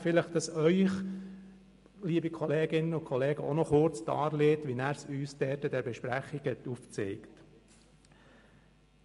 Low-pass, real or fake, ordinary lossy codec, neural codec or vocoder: 14.4 kHz; real; MP3, 48 kbps; none